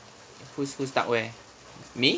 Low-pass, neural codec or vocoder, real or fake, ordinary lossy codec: none; none; real; none